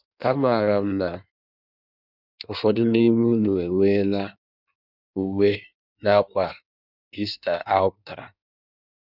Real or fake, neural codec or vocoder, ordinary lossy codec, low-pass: fake; codec, 16 kHz in and 24 kHz out, 1.1 kbps, FireRedTTS-2 codec; none; 5.4 kHz